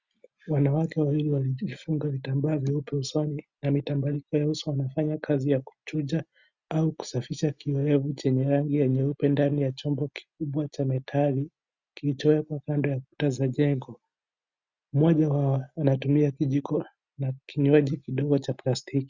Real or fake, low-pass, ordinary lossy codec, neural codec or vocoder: real; 7.2 kHz; Opus, 64 kbps; none